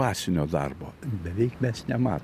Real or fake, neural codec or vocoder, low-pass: real; none; 14.4 kHz